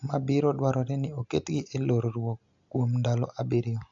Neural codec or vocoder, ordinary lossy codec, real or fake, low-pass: none; AAC, 64 kbps; real; 7.2 kHz